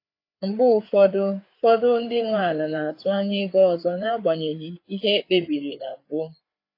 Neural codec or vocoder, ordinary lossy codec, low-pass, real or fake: codec, 16 kHz, 4 kbps, FreqCodec, larger model; AAC, 32 kbps; 5.4 kHz; fake